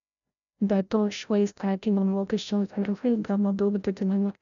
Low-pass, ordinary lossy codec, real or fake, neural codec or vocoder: 7.2 kHz; MP3, 96 kbps; fake; codec, 16 kHz, 0.5 kbps, FreqCodec, larger model